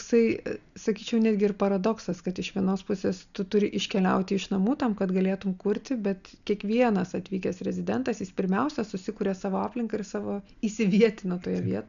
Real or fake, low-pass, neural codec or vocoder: real; 7.2 kHz; none